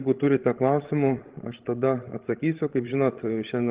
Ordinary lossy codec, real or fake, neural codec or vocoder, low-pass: Opus, 16 kbps; fake; codec, 16 kHz, 16 kbps, FreqCodec, larger model; 3.6 kHz